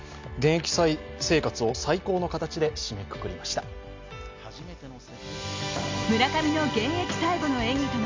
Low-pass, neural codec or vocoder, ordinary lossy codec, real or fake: 7.2 kHz; none; MP3, 64 kbps; real